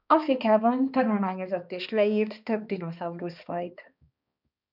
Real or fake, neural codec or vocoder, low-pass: fake; codec, 16 kHz, 2 kbps, X-Codec, HuBERT features, trained on balanced general audio; 5.4 kHz